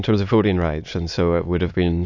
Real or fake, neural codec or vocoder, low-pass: fake; autoencoder, 22.05 kHz, a latent of 192 numbers a frame, VITS, trained on many speakers; 7.2 kHz